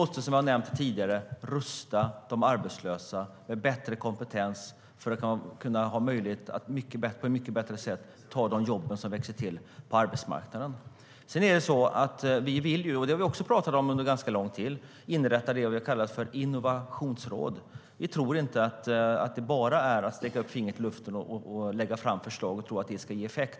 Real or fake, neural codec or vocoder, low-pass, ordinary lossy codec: real; none; none; none